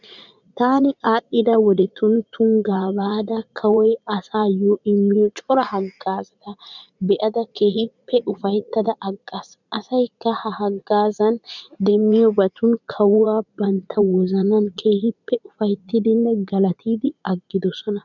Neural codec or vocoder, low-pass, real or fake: vocoder, 44.1 kHz, 80 mel bands, Vocos; 7.2 kHz; fake